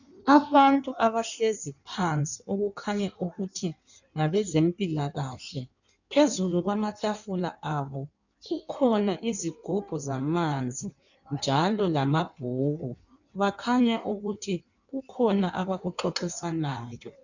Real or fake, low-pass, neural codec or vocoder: fake; 7.2 kHz; codec, 16 kHz in and 24 kHz out, 1.1 kbps, FireRedTTS-2 codec